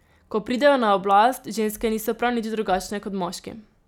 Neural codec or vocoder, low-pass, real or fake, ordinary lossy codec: none; 19.8 kHz; real; none